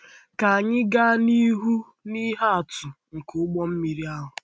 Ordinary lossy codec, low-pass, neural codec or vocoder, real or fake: none; none; none; real